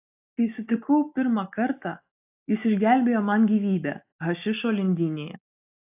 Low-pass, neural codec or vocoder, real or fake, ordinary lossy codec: 3.6 kHz; none; real; AAC, 32 kbps